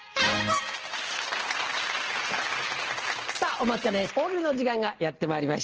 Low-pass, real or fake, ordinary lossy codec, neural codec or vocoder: 7.2 kHz; real; Opus, 16 kbps; none